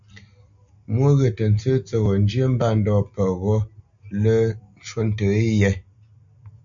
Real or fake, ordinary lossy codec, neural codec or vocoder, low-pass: real; AAC, 64 kbps; none; 7.2 kHz